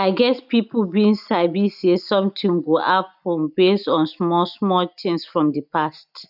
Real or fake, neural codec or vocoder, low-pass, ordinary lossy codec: real; none; 5.4 kHz; none